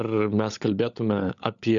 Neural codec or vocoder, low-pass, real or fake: codec, 16 kHz, 16 kbps, FunCodec, trained on LibriTTS, 50 frames a second; 7.2 kHz; fake